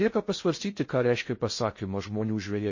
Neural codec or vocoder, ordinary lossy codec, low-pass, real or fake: codec, 16 kHz in and 24 kHz out, 0.6 kbps, FocalCodec, streaming, 4096 codes; MP3, 32 kbps; 7.2 kHz; fake